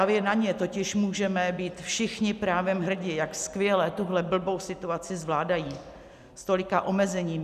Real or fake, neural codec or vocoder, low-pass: real; none; 14.4 kHz